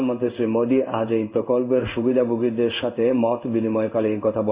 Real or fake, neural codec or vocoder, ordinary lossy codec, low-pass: fake; codec, 16 kHz in and 24 kHz out, 1 kbps, XY-Tokenizer; Opus, 64 kbps; 3.6 kHz